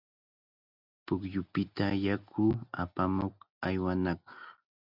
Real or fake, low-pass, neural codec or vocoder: real; 5.4 kHz; none